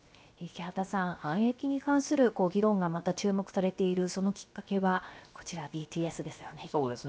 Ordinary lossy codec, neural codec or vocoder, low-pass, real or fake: none; codec, 16 kHz, 0.7 kbps, FocalCodec; none; fake